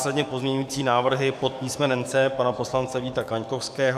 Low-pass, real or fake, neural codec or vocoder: 14.4 kHz; fake; codec, 44.1 kHz, 7.8 kbps, DAC